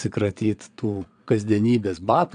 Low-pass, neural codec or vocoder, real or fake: 9.9 kHz; vocoder, 22.05 kHz, 80 mel bands, Vocos; fake